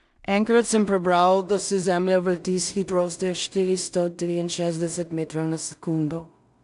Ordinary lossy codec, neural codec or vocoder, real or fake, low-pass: none; codec, 16 kHz in and 24 kHz out, 0.4 kbps, LongCat-Audio-Codec, two codebook decoder; fake; 10.8 kHz